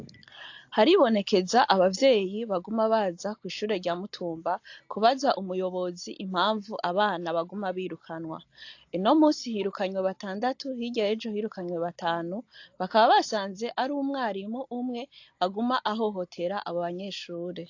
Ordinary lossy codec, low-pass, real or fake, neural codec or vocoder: AAC, 48 kbps; 7.2 kHz; real; none